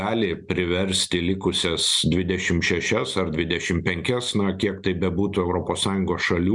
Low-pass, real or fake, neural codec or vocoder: 10.8 kHz; real; none